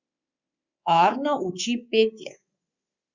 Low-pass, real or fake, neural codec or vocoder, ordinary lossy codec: 7.2 kHz; fake; codec, 24 kHz, 3.1 kbps, DualCodec; Opus, 64 kbps